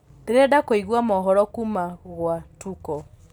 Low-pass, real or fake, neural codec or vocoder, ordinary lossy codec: 19.8 kHz; real; none; none